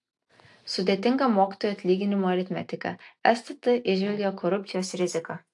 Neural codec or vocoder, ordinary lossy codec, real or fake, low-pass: none; AAC, 64 kbps; real; 10.8 kHz